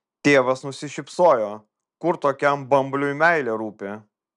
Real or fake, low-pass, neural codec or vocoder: real; 10.8 kHz; none